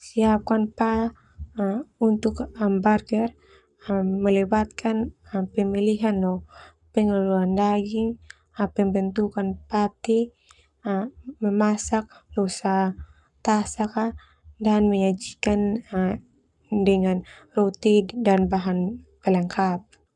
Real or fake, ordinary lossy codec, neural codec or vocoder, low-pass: fake; none; codec, 44.1 kHz, 7.8 kbps, Pupu-Codec; 10.8 kHz